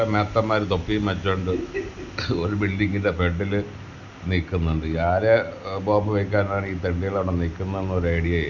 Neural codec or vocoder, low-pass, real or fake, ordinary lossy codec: none; 7.2 kHz; real; none